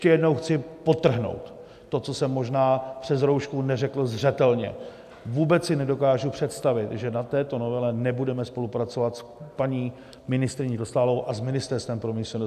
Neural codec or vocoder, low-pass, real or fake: none; 14.4 kHz; real